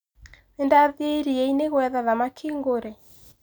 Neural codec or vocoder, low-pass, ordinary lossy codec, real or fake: none; none; none; real